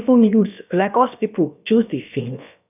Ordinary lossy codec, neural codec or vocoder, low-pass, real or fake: none; codec, 16 kHz, about 1 kbps, DyCAST, with the encoder's durations; 3.6 kHz; fake